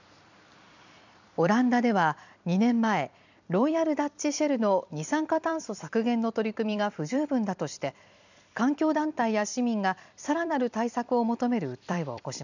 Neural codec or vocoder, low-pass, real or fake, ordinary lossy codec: vocoder, 44.1 kHz, 128 mel bands every 256 samples, BigVGAN v2; 7.2 kHz; fake; none